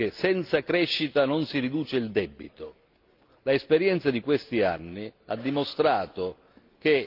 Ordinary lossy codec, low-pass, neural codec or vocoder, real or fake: Opus, 32 kbps; 5.4 kHz; none; real